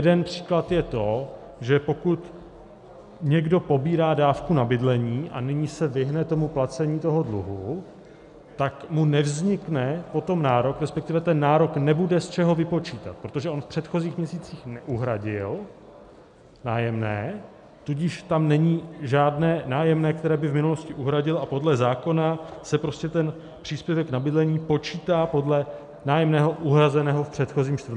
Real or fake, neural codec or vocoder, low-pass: real; none; 10.8 kHz